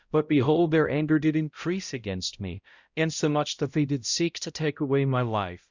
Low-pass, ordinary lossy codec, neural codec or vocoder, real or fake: 7.2 kHz; Opus, 64 kbps; codec, 16 kHz, 0.5 kbps, X-Codec, HuBERT features, trained on balanced general audio; fake